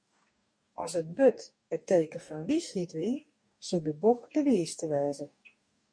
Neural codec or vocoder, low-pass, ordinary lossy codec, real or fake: codec, 44.1 kHz, 2.6 kbps, DAC; 9.9 kHz; MP3, 64 kbps; fake